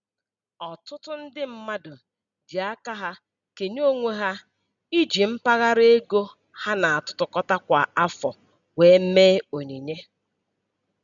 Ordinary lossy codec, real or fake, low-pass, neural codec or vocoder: none; real; 7.2 kHz; none